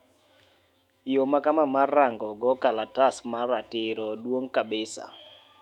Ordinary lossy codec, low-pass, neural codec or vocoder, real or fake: none; 19.8 kHz; autoencoder, 48 kHz, 128 numbers a frame, DAC-VAE, trained on Japanese speech; fake